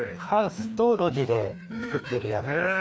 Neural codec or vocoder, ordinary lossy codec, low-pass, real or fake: codec, 16 kHz, 2 kbps, FreqCodec, larger model; none; none; fake